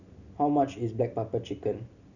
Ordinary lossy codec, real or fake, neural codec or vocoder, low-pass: none; real; none; 7.2 kHz